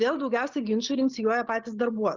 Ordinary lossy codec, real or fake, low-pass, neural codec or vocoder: Opus, 32 kbps; real; 7.2 kHz; none